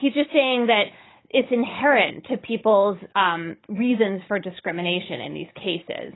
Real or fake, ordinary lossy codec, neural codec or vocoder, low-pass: fake; AAC, 16 kbps; codec, 16 kHz, 16 kbps, FunCodec, trained on Chinese and English, 50 frames a second; 7.2 kHz